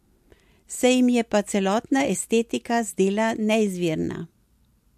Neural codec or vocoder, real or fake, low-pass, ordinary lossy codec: none; real; 14.4 kHz; MP3, 64 kbps